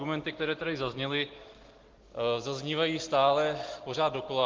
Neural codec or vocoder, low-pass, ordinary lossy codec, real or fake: none; 7.2 kHz; Opus, 16 kbps; real